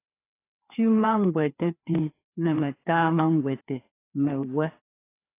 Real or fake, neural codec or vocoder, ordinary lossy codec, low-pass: fake; codec, 16 kHz, 2 kbps, FreqCodec, larger model; AAC, 24 kbps; 3.6 kHz